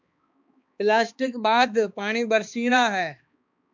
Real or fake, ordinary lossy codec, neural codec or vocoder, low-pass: fake; MP3, 64 kbps; codec, 16 kHz, 2 kbps, X-Codec, HuBERT features, trained on balanced general audio; 7.2 kHz